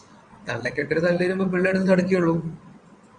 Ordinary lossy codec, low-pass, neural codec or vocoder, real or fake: Opus, 64 kbps; 9.9 kHz; vocoder, 22.05 kHz, 80 mel bands, WaveNeXt; fake